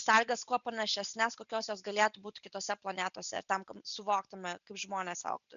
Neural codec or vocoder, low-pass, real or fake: none; 7.2 kHz; real